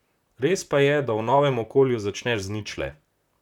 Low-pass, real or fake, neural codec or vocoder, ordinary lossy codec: 19.8 kHz; fake; vocoder, 44.1 kHz, 128 mel bands, Pupu-Vocoder; none